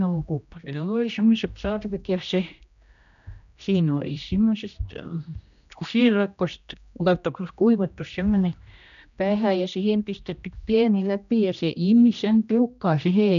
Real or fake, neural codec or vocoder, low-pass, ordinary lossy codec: fake; codec, 16 kHz, 1 kbps, X-Codec, HuBERT features, trained on general audio; 7.2 kHz; none